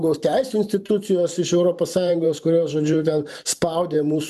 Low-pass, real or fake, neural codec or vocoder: 14.4 kHz; fake; vocoder, 44.1 kHz, 128 mel bands every 512 samples, BigVGAN v2